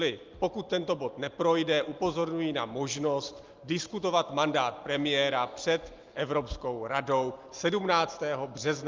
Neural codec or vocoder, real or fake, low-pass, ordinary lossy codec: none; real; 7.2 kHz; Opus, 32 kbps